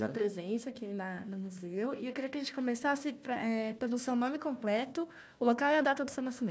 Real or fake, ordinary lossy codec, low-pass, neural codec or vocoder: fake; none; none; codec, 16 kHz, 1 kbps, FunCodec, trained on Chinese and English, 50 frames a second